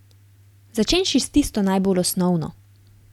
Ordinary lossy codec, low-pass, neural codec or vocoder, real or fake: none; 19.8 kHz; none; real